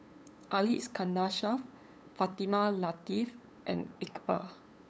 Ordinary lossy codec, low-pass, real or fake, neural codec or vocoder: none; none; fake; codec, 16 kHz, 8 kbps, FunCodec, trained on LibriTTS, 25 frames a second